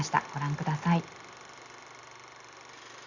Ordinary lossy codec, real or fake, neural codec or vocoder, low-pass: Opus, 64 kbps; real; none; 7.2 kHz